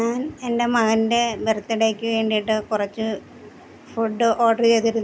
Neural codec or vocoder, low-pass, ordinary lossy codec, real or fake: none; none; none; real